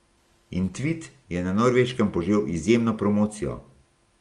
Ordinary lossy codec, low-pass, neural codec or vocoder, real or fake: Opus, 32 kbps; 10.8 kHz; none; real